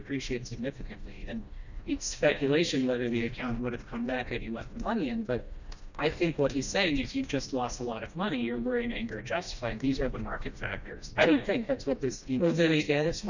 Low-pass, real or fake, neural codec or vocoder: 7.2 kHz; fake; codec, 16 kHz, 1 kbps, FreqCodec, smaller model